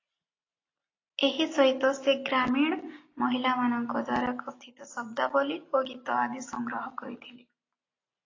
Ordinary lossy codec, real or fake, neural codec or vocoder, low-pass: AAC, 32 kbps; real; none; 7.2 kHz